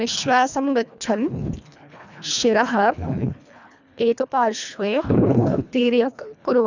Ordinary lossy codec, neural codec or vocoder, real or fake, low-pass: none; codec, 24 kHz, 1.5 kbps, HILCodec; fake; 7.2 kHz